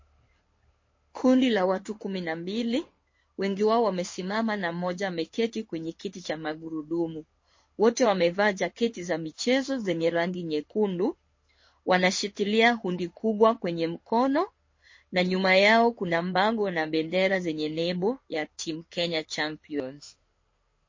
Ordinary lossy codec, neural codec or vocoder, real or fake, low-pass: MP3, 32 kbps; codec, 16 kHz in and 24 kHz out, 1 kbps, XY-Tokenizer; fake; 7.2 kHz